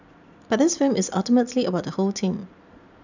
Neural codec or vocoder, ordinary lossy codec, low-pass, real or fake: none; none; 7.2 kHz; real